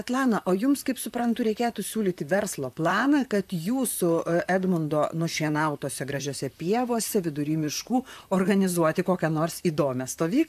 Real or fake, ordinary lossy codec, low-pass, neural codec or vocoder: fake; AAC, 96 kbps; 14.4 kHz; vocoder, 44.1 kHz, 128 mel bands, Pupu-Vocoder